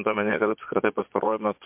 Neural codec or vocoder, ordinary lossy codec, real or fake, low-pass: vocoder, 22.05 kHz, 80 mel bands, Vocos; MP3, 32 kbps; fake; 3.6 kHz